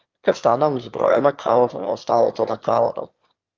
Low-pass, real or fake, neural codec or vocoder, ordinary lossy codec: 7.2 kHz; fake; autoencoder, 22.05 kHz, a latent of 192 numbers a frame, VITS, trained on one speaker; Opus, 24 kbps